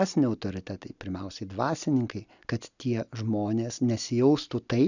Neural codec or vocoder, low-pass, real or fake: none; 7.2 kHz; real